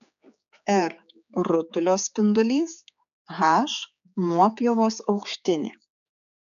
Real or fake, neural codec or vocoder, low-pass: fake; codec, 16 kHz, 4 kbps, X-Codec, HuBERT features, trained on general audio; 7.2 kHz